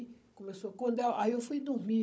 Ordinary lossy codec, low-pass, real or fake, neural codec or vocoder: none; none; fake; codec, 16 kHz, 16 kbps, FunCodec, trained on Chinese and English, 50 frames a second